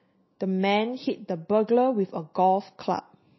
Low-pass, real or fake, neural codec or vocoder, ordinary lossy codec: 7.2 kHz; real; none; MP3, 24 kbps